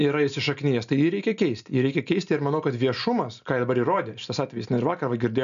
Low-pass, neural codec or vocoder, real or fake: 7.2 kHz; none; real